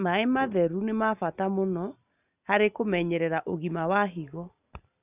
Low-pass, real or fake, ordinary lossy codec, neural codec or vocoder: 3.6 kHz; real; none; none